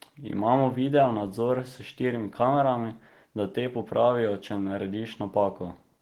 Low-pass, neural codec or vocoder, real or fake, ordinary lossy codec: 19.8 kHz; none; real; Opus, 16 kbps